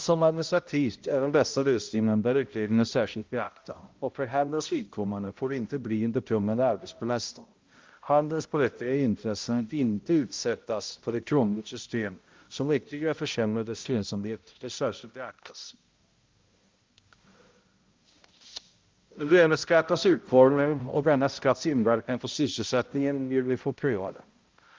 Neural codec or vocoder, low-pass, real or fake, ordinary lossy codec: codec, 16 kHz, 0.5 kbps, X-Codec, HuBERT features, trained on balanced general audio; 7.2 kHz; fake; Opus, 32 kbps